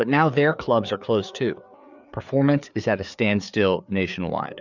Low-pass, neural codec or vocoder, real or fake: 7.2 kHz; codec, 16 kHz, 4 kbps, FreqCodec, larger model; fake